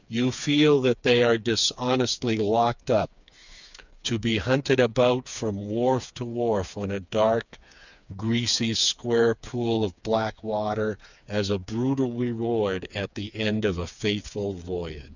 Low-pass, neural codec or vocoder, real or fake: 7.2 kHz; codec, 16 kHz, 4 kbps, FreqCodec, smaller model; fake